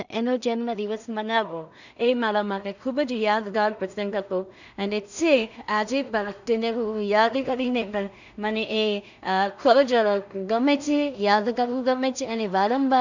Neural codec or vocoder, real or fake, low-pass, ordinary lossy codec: codec, 16 kHz in and 24 kHz out, 0.4 kbps, LongCat-Audio-Codec, two codebook decoder; fake; 7.2 kHz; none